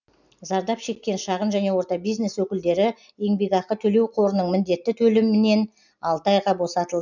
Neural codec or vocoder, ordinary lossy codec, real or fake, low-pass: none; none; real; 7.2 kHz